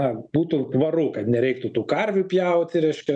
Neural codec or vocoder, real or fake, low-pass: none; real; 9.9 kHz